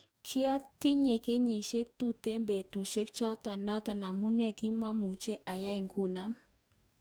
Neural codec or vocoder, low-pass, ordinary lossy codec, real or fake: codec, 44.1 kHz, 2.6 kbps, DAC; none; none; fake